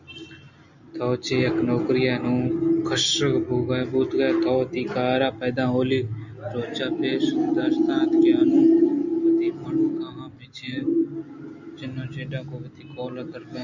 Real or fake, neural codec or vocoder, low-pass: real; none; 7.2 kHz